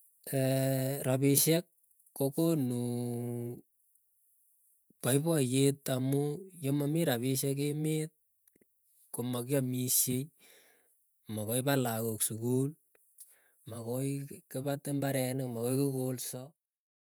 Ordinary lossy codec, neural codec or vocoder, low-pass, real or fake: none; vocoder, 48 kHz, 128 mel bands, Vocos; none; fake